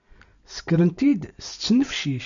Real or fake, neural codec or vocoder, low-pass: real; none; 7.2 kHz